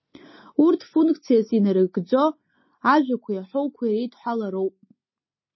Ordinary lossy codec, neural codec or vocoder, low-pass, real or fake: MP3, 24 kbps; none; 7.2 kHz; real